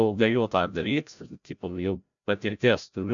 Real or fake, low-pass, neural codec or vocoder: fake; 7.2 kHz; codec, 16 kHz, 0.5 kbps, FreqCodec, larger model